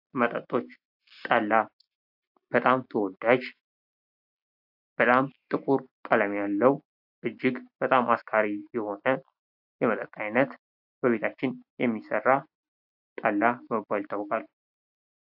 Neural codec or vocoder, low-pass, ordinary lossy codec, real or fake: none; 5.4 kHz; AAC, 48 kbps; real